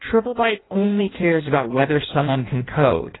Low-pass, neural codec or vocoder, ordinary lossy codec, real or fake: 7.2 kHz; codec, 16 kHz in and 24 kHz out, 0.6 kbps, FireRedTTS-2 codec; AAC, 16 kbps; fake